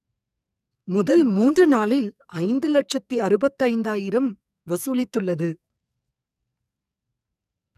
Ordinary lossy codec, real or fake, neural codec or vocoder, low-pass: none; fake; codec, 44.1 kHz, 2.6 kbps, SNAC; 14.4 kHz